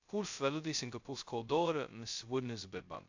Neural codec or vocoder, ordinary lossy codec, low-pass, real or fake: codec, 16 kHz, 0.2 kbps, FocalCodec; AAC, 48 kbps; 7.2 kHz; fake